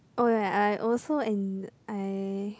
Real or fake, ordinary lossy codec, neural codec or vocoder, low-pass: real; none; none; none